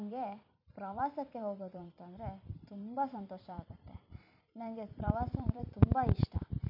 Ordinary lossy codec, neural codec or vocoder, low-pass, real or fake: none; vocoder, 44.1 kHz, 128 mel bands every 256 samples, BigVGAN v2; 5.4 kHz; fake